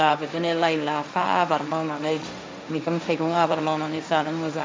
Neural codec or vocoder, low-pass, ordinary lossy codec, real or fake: codec, 16 kHz, 1.1 kbps, Voila-Tokenizer; none; none; fake